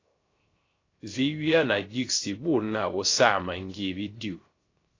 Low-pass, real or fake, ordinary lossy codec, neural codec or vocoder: 7.2 kHz; fake; AAC, 32 kbps; codec, 16 kHz, 0.3 kbps, FocalCodec